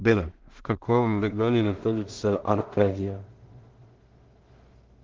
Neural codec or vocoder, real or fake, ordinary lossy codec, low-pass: codec, 16 kHz in and 24 kHz out, 0.4 kbps, LongCat-Audio-Codec, two codebook decoder; fake; Opus, 16 kbps; 7.2 kHz